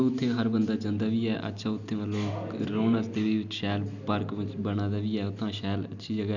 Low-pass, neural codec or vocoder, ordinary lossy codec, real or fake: 7.2 kHz; none; none; real